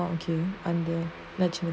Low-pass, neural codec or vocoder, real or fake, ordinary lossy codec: none; none; real; none